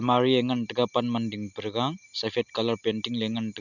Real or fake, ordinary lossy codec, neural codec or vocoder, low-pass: real; none; none; 7.2 kHz